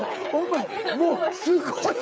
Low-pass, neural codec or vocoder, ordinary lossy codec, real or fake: none; codec, 16 kHz, 16 kbps, FreqCodec, smaller model; none; fake